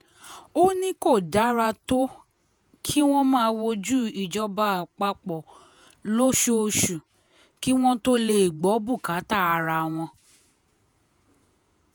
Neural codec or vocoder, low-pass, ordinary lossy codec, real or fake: vocoder, 48 kHz, 128 mel bands, Vocos; none; none; fake